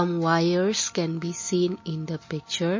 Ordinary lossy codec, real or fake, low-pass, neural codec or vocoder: MP3, 32 kbps; real; 7.2 kHz; none